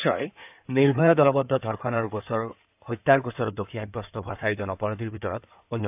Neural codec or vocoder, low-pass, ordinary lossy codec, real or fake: codec, 16 kHz in and 24 kHz out, 2.2 kbps, FireRedTTS-2 codec; 3.6 kHz; AAC, 32 kbps; fake